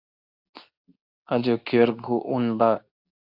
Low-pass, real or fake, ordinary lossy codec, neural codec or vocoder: 5.4 kHz; fake; AAC, 48 kbps; codec, 24 kHz, 0.9 kbps, WavTokenizer, medium speech release version 2